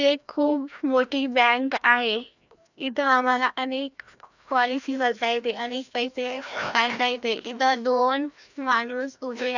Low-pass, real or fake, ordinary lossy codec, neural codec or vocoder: 7.2 kHz; fake; none; codec, 16 kHz, 1 kbps, FreqCodec, larger model